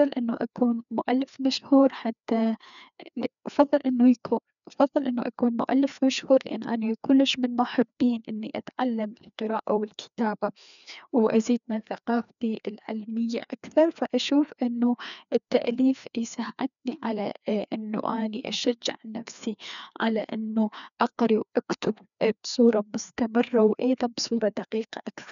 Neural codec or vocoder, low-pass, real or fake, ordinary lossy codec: codec, 16 kHz, 2 kbps, FreqCodec, larger model; 7.2 kHz; fake; none